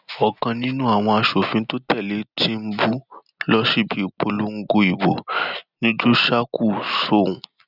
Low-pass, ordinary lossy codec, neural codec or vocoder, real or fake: 5.4 kHz; none; none; real